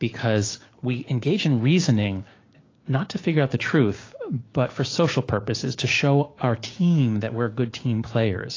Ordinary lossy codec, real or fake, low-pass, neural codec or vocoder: AAC, 32 kbps; fake; 7.2 kHz; codec, 16 kHz, 6 kbps, DAC